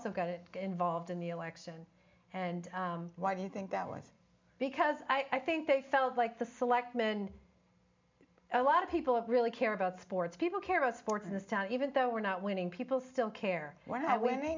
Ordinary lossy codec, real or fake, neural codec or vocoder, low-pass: MP3, 64 kbps; real; none; 7.2 kHz